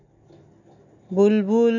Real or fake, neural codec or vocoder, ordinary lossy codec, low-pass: real; none; none; 7.2 kHz